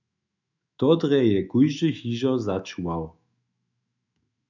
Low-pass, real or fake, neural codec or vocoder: 7.2 kHz; fake; autoencoder, 48 kHz, 128 numbers a frame, DAC-VAE, trained on Japanese speech